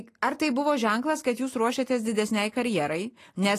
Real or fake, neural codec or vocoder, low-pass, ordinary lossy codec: real; none; 14.4 kHz; AAC, 48 kbps